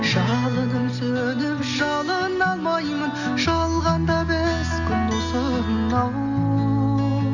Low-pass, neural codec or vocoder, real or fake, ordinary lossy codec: 7.2 kHz; none; real; none